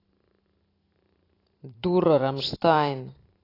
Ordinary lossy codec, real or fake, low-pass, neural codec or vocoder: AAC, 24 kbps; fake; 5.4 kHz; vocoder, 44.1 kHz, 128 mel bands every 512 samples, BigVGAN v2